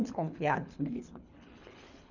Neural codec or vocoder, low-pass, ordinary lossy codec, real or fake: codec, 24 kHz, 3 kbps, HILCodec; 7.2 kHz; none; fake